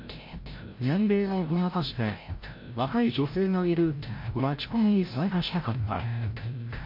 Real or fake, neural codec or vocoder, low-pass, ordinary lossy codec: fake; codec, 16 kHz, 0.5 kbps, FreqCodec, larger model; 5.4 kHz; MP3, 32 kbps